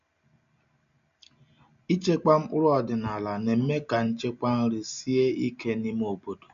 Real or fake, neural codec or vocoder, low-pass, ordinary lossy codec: real; none; 7.2 kHz; none